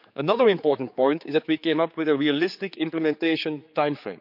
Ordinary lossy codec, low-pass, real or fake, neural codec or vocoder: none; 5.4 kHz; fake; codec, 16 kHz, 4 kbps, X-Codec, HuBERT features, trained on general audio